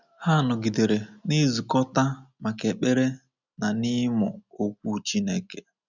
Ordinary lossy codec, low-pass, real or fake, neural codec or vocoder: none; 7.2 kHz; real; none